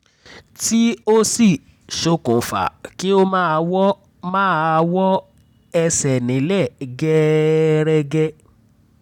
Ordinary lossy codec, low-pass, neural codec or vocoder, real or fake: none; 19.8 kHz; none; real